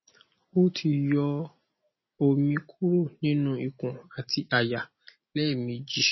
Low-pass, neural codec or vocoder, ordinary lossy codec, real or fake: 7.2 kHz; none; MP3, 24 kbps; real